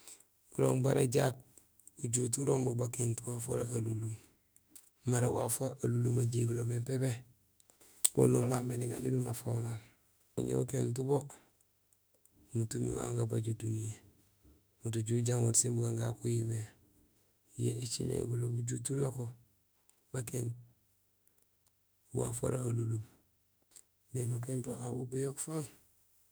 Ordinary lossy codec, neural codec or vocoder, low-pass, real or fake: none; autoencoder, 48 kHz, 32 numbers a frame, DAC-VAE, trained on Japanese speech; none; fake